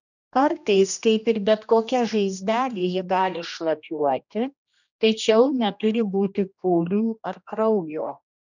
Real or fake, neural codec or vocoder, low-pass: fake; codec, 16 kHz, 1 kbps, X-Codec, HuBERT features, trained on general audio; 7.2 kHz